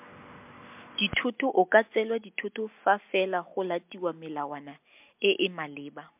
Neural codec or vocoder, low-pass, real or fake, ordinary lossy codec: none; 3.6 kHz; real; MP3, 32 kbps